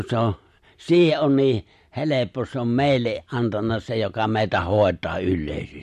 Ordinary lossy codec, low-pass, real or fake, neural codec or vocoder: MP3, 64 kbps; 14.4 kHz; real; none